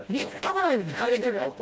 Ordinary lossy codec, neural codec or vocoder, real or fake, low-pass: none; codec, 16 kHz, 0.5 kbps, FreqCodec, smaller model; fake; none